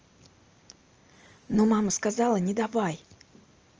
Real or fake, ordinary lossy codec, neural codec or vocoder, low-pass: real; Opus, 24 kbps; none; 7.2 kHz